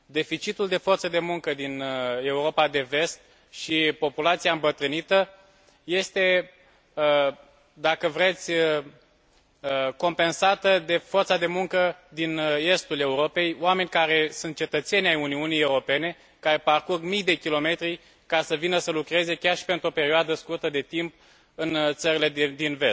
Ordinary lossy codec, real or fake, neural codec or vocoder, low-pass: none; real; none; none